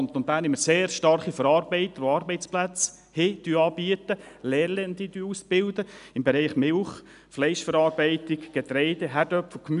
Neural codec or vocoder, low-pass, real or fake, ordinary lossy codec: none; 10.8 kHz; real; none